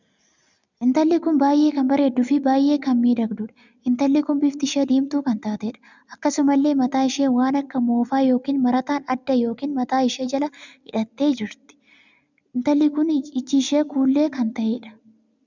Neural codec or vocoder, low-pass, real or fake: none; 7.2 kHz; real